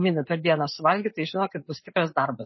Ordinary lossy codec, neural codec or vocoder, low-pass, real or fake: MP3, 24 kbps; none; 7.2 kHz; real